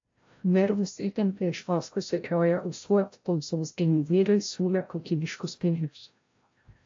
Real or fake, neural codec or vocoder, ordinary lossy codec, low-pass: fake; codec, 16 kHz, 0.5 kbps, FreqCodec, larger model; AAC, 48 kbps; 7.2 kHz